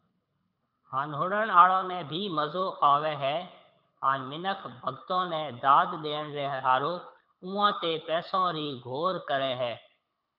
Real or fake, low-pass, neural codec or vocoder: fake; 5.4 kHz; codec, 24 kHz, 6 kbps, HILCodec